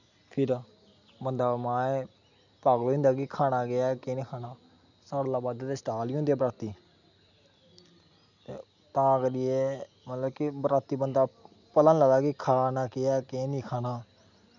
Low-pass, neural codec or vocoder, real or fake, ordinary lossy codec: 7.2 kHz; none; real; none